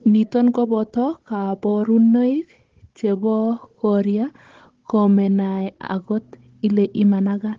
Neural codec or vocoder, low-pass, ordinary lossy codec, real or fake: codec, 16 kHz, 16 kbps, FunCodec, trained on Chinese and English, 50 frames a second; 7.2 kHz; Opus, 16 kbps; fake